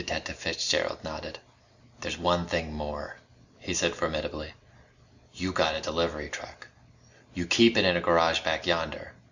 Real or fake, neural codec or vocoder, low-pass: real; none; 7.2 kHz